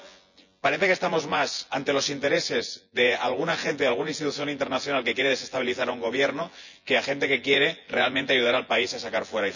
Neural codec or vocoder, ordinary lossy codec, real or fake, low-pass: vocoder, 24 kHz, 100 mel bands, Vocos; none; fake; 7.2 kHz